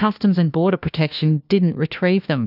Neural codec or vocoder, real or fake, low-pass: codec, 16 kHz, 1 kbps, FunCodec, trained on LibriTTS, 50 frames a second; fake; 5.4 kHz